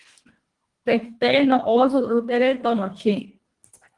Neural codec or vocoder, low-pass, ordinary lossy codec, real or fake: codec, 24 kHz, 1.5 kbps, HILCodec; 10.8 kHz; Opus, 24 kbps; fake